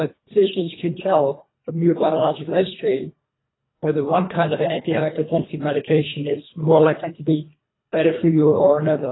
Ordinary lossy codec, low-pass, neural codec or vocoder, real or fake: AAC, 16 kbps; 7.2 kHz; codec, 24 kHz, 1.5 kbps, HILCodec; fake